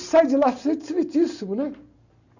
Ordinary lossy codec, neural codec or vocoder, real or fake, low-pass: none; none; real; 7.2 kHz